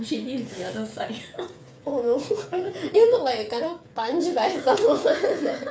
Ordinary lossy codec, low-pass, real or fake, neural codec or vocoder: none; none; fake; codec, 16 kHz, 8 kbps, FreqCodec, smaller model